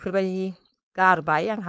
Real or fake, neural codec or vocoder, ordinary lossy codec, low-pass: fake; codec, 16 kHz, 4.8 kbps, FACodec; none; none